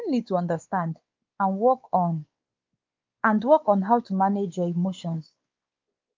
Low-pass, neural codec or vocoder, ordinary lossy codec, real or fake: 7.2 kHz; codec, 16 kHz, 4 kbps, X-Codec, WavLM features, trained on Multilingual LibriSpeech; Opus, 24 kbps; fake